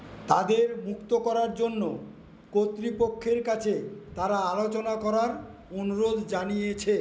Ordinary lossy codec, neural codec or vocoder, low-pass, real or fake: none; none; none; real